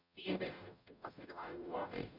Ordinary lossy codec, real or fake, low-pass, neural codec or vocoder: none; fake; 5.4 kHz; codec, 44.1 kHz, 0.9 kbps, DAC